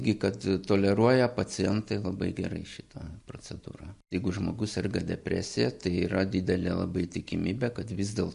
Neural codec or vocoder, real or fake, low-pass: none; real; 10.8 kHz